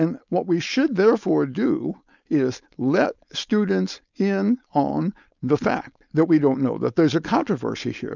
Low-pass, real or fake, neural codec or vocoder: 7.2 kHz; fake; codec, 16 kHz, 4.8 kbps, FACodec